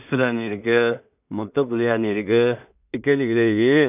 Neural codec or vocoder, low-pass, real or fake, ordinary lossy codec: codec, 16 kHz in and 24 kHz out, 0.4 kbps, LongCat-Audio-Codec, two codebook decoder; 3.6 kHz; fake; none